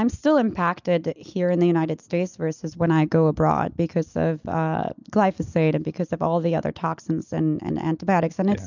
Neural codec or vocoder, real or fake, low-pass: none; real; 7.2 kHz